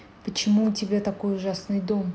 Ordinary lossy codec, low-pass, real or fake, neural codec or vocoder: none; none; real; none